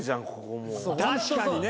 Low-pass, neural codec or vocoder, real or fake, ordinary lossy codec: none; none; real; none